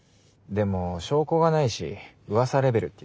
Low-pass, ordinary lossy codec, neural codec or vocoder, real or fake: none; none; none; real